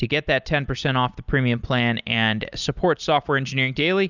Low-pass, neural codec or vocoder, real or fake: 7.2 kHz; none; real